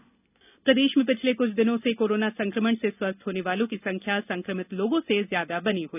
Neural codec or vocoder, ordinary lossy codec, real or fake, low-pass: none; none; real; 3.6 kHz